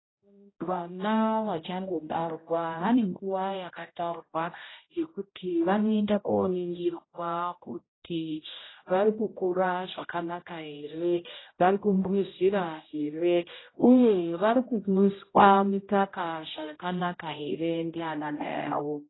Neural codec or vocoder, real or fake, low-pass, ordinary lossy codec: codec, 16 kHz, 0.5 kbps, X-Codec, HuBERT features, trained on general audio; fake; 7.2 kHz; AAC, 16 kbps